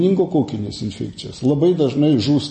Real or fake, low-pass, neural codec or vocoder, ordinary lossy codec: real; 9.9 kHz; none; MP3, 32 kbps